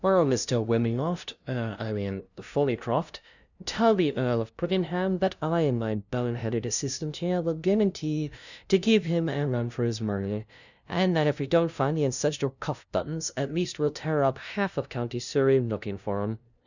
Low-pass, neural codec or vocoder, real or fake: 7.2 kHz; codec, 16 kHz, 0.5 kbps, FunCodec, trained on LibriTTS, 25 frames a second; fake